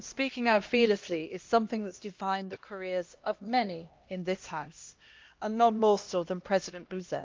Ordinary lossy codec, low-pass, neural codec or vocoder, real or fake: Opus, 24 kbps; 7.2 kHz; codec, 16 kHz, 1 kbps, X-Codec, HuBERT features, trained on LibriSpeech; fake